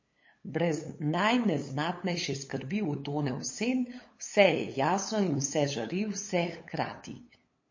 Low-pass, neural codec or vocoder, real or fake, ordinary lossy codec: 7.2 kHz; codec, 16 kHz, 8 kbps, FunCodec, trained on LibriTTS, 25 frames a second; fake; MP3, 32 kbps